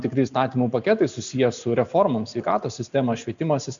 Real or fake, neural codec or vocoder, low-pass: real; none; 7.2 kHz